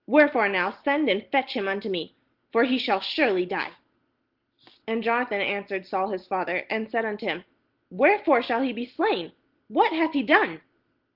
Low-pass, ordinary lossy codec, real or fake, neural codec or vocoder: 5.4 kHz; Opus, 16 kbps; real; none